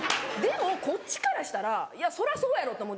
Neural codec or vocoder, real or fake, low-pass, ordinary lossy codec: none; real; none; none